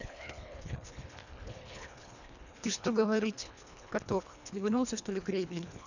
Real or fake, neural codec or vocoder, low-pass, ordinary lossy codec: fake; codec, 24 kHz, 1.5 kbps, HILCodec; 7.2 kHz; none